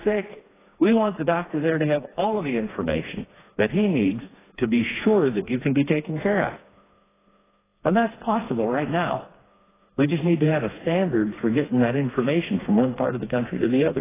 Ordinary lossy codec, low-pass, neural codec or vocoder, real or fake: AAC, 16 kbps; 3.6 kHz; codec, 16 kHz, 2 kbps, FreqCodec, smaller model; fake